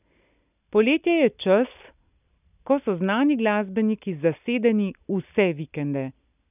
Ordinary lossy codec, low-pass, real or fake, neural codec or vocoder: none; 3.6 kHz; real; none